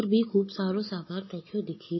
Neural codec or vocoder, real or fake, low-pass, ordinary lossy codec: none; real; 7.2 kHz; MP3, 24 kbps